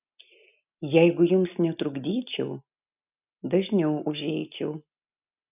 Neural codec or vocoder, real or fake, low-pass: none; real; 3.6 kHz